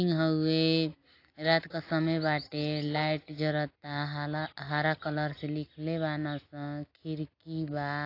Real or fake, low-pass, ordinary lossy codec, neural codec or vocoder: real; 5.4 kHz; AAC, 32 kbps; none